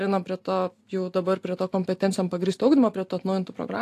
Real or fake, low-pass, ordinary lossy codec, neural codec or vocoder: real; 14.4 kHz; AAC, 64 kbps; none